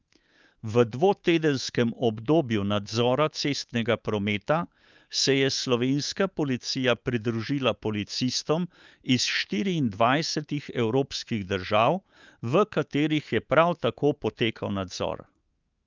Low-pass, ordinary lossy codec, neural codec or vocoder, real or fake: 7.2 kHz; Opus, 24 kbps; codec, 24 kHz, 3.1 kbps, DualCodec; fake